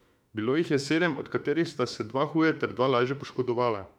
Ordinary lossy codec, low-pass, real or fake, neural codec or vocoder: none; 19.8 kHz; fake; autoencoder, 48 kHz, 32 numbers a frame, DAC-VAE, trained on Japanese speech